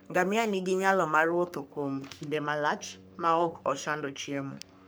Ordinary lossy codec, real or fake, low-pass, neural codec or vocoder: none; fake; none; codec, 44.1 kHz, 3.4 kbps, Pupu-Codec